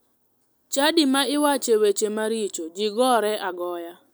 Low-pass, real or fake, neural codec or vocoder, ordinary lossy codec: none; real; none; none